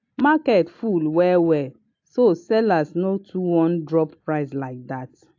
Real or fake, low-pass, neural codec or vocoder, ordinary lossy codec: real; 7.2 kHz; none; AAC, 48 kbps